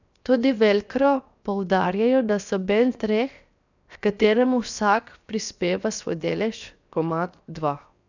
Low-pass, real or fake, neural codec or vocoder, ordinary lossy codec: 7.2 kHz; fake; codec, 16 kHz, 0.7 kbps, FocalCodec; none